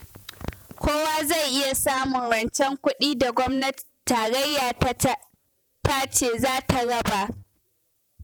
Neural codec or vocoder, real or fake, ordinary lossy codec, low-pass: vocoder, 48 kHz, 128 mel bands, Vocos; fake; none; none